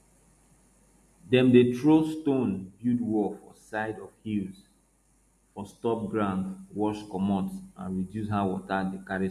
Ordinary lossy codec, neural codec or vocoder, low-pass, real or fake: MP3, 64 kbps; none; 14.4 kHz; real